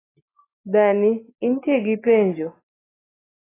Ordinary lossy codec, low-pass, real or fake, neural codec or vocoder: AAC, 16 kbps; 3.6 kHz; real; none